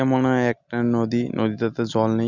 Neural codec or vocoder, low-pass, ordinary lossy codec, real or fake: none; 7.2 kHz; none; real